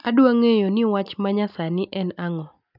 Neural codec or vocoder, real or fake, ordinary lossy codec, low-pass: none; real; none; 5.4 kHz